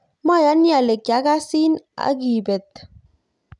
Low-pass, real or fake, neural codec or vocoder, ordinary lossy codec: 10.8 kHz; real; none; none